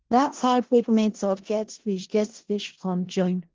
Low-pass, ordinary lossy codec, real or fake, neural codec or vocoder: 7.2 kHz; Opus, 16 kbps; fake; codec, 16 kHz in and 24 kHz out, 0.4 kbps, LongCat-Audio-Codec, four codebook decoder